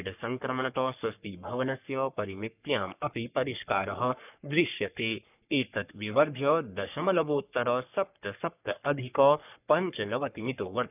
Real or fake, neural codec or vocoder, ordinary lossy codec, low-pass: fake; codec, 44.1 kHz, 3.4 kbps, Pupu-Codec; none; 3.6 kHz